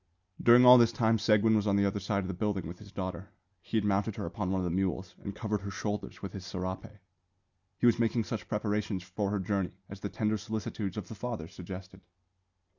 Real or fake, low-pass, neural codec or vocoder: real; 7.2 kHz; none